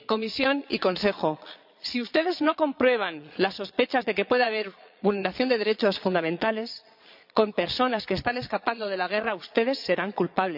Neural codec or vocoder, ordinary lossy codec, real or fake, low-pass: vocoder, 22.05 kHz, 80 mel bands, Vocos; none; fake; 5.4 kHz